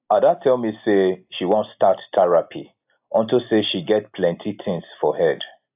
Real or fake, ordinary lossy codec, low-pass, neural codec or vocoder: real; AAC, 32 kbps; 3.6 kHz; none